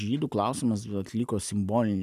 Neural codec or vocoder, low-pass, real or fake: none; 14.4 kHz; real